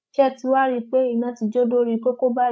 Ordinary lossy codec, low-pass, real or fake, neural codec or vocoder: none; none; fake; codec, 16 kHz, 16 kbps, FreqCodec, larger model